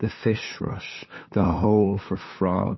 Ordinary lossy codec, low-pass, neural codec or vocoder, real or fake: MP3, 24 kbps; 7.2 kHz; codec, 16 kHz, 2 kbps, FunCodec, trained on LibriTTS, 25 frames a second; fake